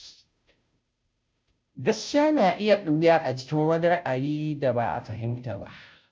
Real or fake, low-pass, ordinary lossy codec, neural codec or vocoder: fake; none; none; codec, 16 kHz, 0.5 kbps, FunCodec, trained on Chinese and English, 25 frames a second